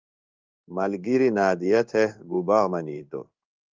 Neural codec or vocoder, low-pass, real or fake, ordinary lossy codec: codec, 16 kHz in and 24 kHz out, 1 kbps, XY-Tokenizer; 7.2 kHz; fake; Opus, 32 kbps